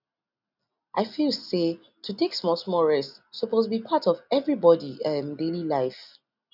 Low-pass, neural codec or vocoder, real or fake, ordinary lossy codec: 5.4 kHz; none; real; none